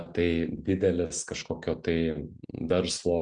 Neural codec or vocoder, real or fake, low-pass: none; real; 9.9 kHz